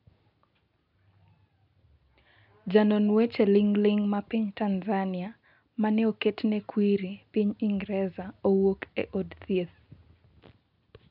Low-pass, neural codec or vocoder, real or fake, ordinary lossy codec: 5.4 kHz; none; real; none